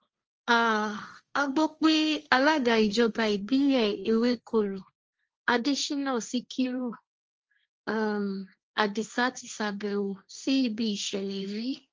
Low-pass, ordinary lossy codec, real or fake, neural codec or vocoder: 7.2 kHz; Opus, 24 kbps; fake; codec, 16 kHz, 1.1 kbps, Voila-Tokenizer